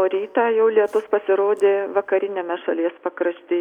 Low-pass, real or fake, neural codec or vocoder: 19.8 kHz; real; none